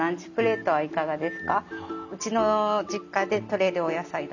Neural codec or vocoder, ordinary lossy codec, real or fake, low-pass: none; none; real; 7.2 kHz